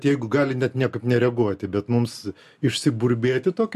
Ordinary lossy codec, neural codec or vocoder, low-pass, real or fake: MP3, 64 kbps; none; 14.4 kHz; real